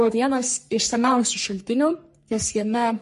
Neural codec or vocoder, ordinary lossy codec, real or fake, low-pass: codec, 44.1 kHz, 3.4 kbps, Pupu-Codec; MP3, 48 kbps; fake; 14.4 kHz